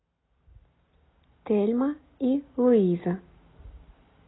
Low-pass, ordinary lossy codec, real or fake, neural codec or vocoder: 7.2 kHz; AAC, 16 kbps; real; none